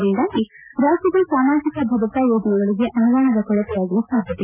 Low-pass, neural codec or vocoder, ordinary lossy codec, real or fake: 3.6 kHz; none; none; real